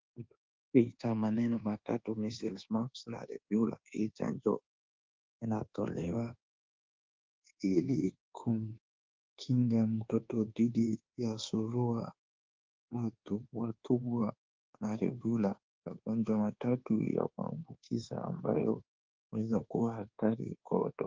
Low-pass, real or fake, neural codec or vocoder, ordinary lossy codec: 7.2 kHz; fake; codec, 24 kHz, 1.2 kbps, DualCodec; Opus, 16 kbps